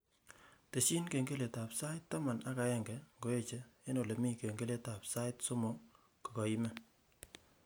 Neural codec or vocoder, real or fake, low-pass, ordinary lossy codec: none; real; none; none